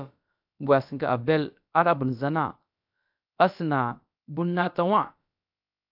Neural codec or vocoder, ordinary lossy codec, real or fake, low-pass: codec, 16 kHz, about 1 kbps, DyCAST, with the encoder's durations; AAC, 48 kbps; fake; 5.4 kHz